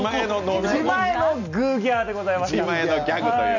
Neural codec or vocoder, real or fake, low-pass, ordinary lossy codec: none; real; 7.2 kHz; none